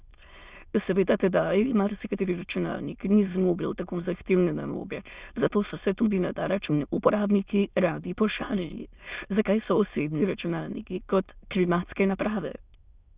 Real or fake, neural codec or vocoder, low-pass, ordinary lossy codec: fake; autoencoder, 22.05 kHz, a latent of 192 numbers a frame, VITS, trained on many speakers; 3.6 kHz; Opus, 64 kbps